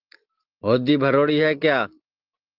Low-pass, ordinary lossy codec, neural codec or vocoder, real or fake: 5.4 kHz; Opus, 24 kbps; none; real